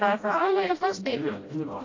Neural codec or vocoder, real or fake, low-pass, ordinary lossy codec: codec, 16 kHz, 0.5 kbps, FreqCodec, smaller model; fake; 7.2 kHz; AAC, 32 kbps